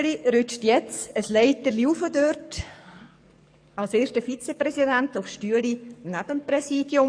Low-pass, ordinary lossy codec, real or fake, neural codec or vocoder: 9.9 kHz; MP3, 64 kbps; fake; codec, 44.1 kHz, 7.8 kbps, DAC